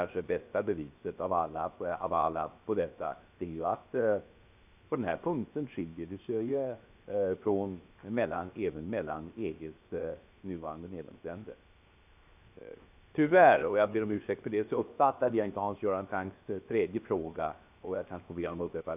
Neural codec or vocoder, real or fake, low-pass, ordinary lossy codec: codec, 16 kHz, 0.7 kbps, FocalCodec; fake; 3.6 kHz; none